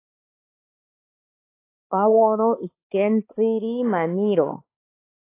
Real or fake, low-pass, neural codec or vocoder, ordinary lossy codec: fake; 3.6 kHz; codec, 16 kHz, 4 kbps, X-Codec, HuBERT features, trained on balanced general audio; AAC, 24 kbps